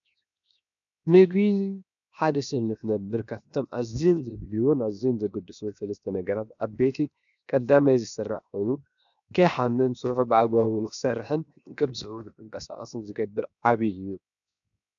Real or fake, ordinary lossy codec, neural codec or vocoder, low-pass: fake; MP3, 96 kbps; codec, 16 kHz, 0.7 kbps, FocalCodec; 7.2 kHz